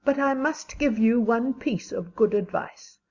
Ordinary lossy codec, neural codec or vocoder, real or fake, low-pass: Opus, 64 kbps; none; real; 7.2 kHz